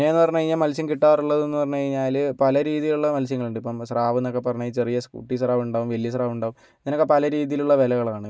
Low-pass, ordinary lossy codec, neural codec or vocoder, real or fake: none; none; none; real